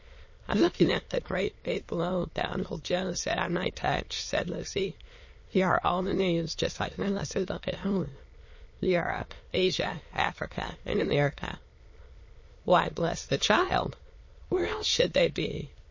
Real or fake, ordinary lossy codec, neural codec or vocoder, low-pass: fake; MP3, 32 kbps; autoencoder, 22.05 kHz, a latent of 192 numbers a frame, VITS, trained on many speakers; 7.2 kHz